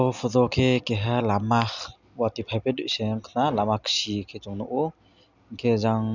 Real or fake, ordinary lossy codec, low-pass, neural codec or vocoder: real; none; 7.2 kHz; none